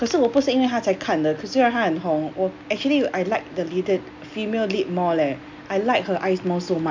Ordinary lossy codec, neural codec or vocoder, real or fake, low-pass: MP3, 64 kbps; none; real; 7.2 kHz